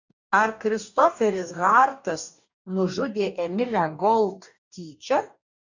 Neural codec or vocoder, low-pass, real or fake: codec, 44.1 kHz, 2.6 kbps, DAC; 7.2 kHz; fake